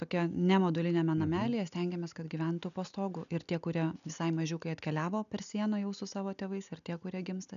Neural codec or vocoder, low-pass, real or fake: none; 7.2 kHz; real